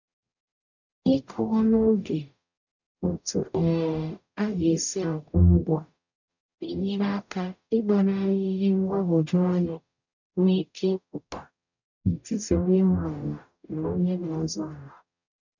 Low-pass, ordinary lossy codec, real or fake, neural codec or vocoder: 7.2 kHz; none; fake; codec, 44.1 kHz, 0.9 kbps, DAC